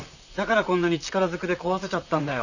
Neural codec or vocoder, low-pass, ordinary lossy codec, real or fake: vocoder, 44.1 kHz, 128 mel bands, Pupu-Vocoder; 7.2 kHz; none; fake